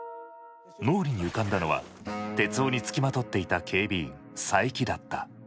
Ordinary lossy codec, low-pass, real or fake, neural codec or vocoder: none; none; real; none